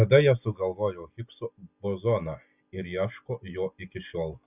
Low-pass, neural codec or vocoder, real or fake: 3.6 kHz; none; real